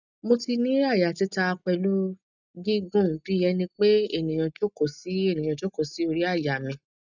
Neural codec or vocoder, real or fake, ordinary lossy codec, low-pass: none; real; none; 7.2 kHz